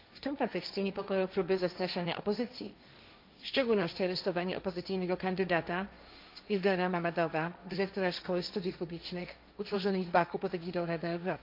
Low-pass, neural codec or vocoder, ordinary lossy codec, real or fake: 5.4 kHz; codec, 16 kHz, 1.1 kbps, Voila-Tokenizer; MP3, 48 kbps; fake